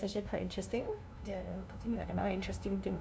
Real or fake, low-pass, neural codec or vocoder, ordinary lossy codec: fake; none; codec, 16 kHz, 0.5 kbps, FunCodec, trained on LibriTTS, 25 frames a second; none